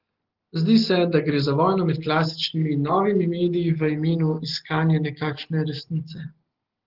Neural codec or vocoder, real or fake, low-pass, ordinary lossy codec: none; real; 5.4 kHz; Opus, 16 kbps